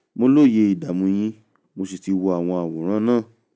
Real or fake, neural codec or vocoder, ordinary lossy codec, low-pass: real; none; none; none